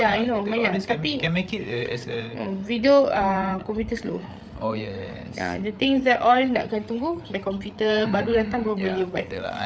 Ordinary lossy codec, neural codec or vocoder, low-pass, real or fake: none; codec, 16 kHz, 8 kbps, FreqCodec, larger model; none; fake